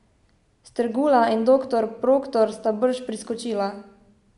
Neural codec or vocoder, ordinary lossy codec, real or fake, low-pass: none; MP3, 64 kbps; real; 10.8 kHz